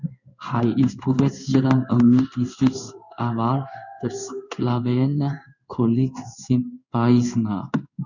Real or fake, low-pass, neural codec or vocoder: fake; 7.2 kHz; codec, 16 kHz in and 24 kHz out, 1 kbps, XY-Tokenizer